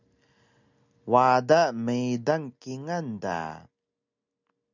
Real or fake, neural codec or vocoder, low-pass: real; none; 7.2 kHz